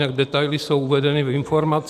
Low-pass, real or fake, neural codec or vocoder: 14.4 kHz; real; none